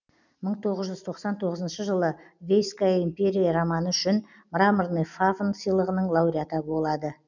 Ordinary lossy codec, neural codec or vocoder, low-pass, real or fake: none; none; 7.2 kHz; real